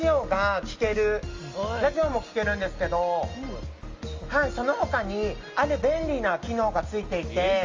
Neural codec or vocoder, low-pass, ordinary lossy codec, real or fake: none; 7.2 kHz; Opus, 32 kbps; real